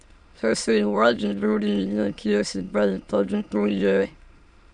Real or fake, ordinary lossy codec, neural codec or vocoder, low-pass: fake; none; autoencoder, 22.05 kHz, a latent of 192 numbers a frame, VITS, trained on many speakers; 9.9 kHz